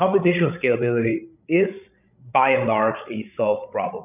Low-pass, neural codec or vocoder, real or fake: 3.6 kHz; codec, 16 kHz, 16 kbps, FreqCodec, larger model; fake